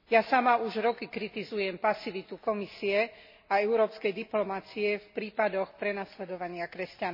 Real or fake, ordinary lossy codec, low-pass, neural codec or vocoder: real; MP3, 24 kbps; 5.4 kHz; none